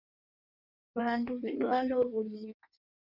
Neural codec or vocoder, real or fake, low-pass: codec, 16 kHz in and 24 kHz out, 1.1 kbps, FireRedTTS-2 codec; fake; 5.4 kHz